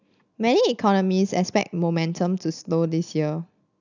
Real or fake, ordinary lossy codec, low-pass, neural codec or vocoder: real; none; 7.2 kHz; none